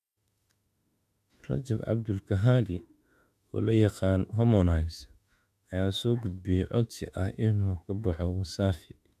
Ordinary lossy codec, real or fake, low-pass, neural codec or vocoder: none; fake; 14.4 kHz; autoencoder, 48 kHz, 32 numbers a frame, DAC-VAE, trained on Japanese speech